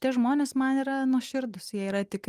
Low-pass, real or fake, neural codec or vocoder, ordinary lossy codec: 14.4 kHz; real; none; Opus, 32 kbps